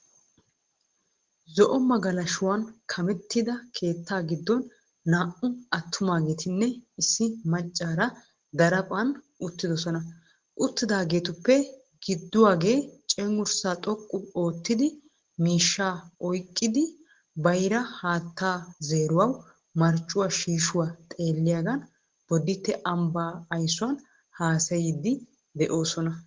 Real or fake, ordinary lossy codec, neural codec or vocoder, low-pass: real; Opus, 16 kbps; none; 7.2 kHz